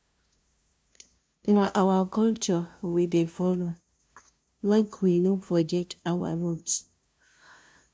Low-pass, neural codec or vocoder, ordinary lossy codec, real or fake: none; codec, 16 kHz, 0.5 kbps, FunCodec, trained on LibriTTS, 25 frames a second; none; fake